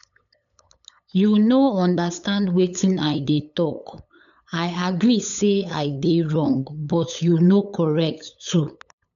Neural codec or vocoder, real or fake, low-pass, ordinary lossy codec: codec, 16 kHz, 8 kbps, FunCodec, trained on LibriTTS, 25 frames a second; fake; 7.2 kHz; none